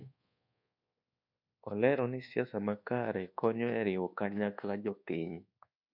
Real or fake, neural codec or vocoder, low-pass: fake; codec, 24 kHz, 1.2 kbps, DualCodec; 5.4 kHz